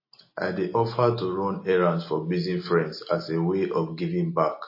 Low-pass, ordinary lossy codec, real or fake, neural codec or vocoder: 5.4 kHz; MP3, 24 kbps; real; none